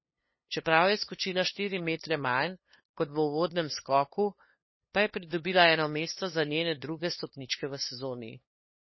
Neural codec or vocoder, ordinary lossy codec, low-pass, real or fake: codec, 16 kHz, 2 kbps, FunCodec, trained on LibriTTS, 25 frames a second; MP3, 24 kbps; 7.2 kHz; fake